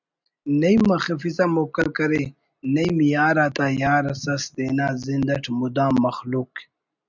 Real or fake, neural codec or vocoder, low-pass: real; none; 7.2 kHz